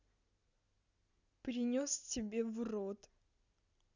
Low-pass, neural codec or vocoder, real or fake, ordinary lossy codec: 7.2 kHz; none; real; none